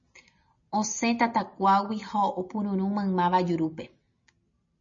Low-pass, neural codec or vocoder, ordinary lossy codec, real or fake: 7.2 kHz; none; MP3, 32 kbps; real